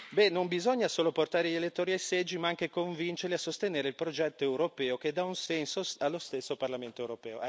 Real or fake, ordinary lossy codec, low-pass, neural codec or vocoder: real; none; none; none